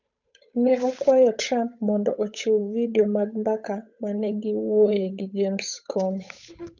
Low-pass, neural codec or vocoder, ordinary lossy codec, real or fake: 7.2 kHz; codec, 16 kHz, 8 kbps, FunCodec, trained on Chinese and English, 25 frames a second; none; fake